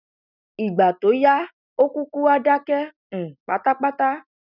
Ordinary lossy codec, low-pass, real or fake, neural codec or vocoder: none; 5.4 kHz; real; none